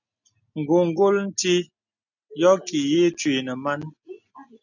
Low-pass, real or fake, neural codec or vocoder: 7.2 kHz; real; none